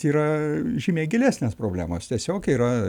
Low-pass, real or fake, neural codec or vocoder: 19.8 kHz; real; none